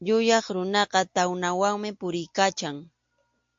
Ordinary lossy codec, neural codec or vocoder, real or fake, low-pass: MP3, 48 kbps; none; real; 7.2 kHz